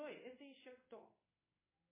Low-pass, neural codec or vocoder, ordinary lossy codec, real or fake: 3.6 kHz; codec, 16 kHz in and 24 kHz out, 1 kbps, XY-Tokenizer; MP3, 16 kbps; fake